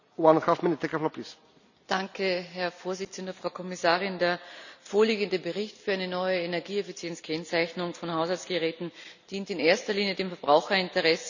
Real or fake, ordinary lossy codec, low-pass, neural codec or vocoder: real; none; 7.2 kHz; none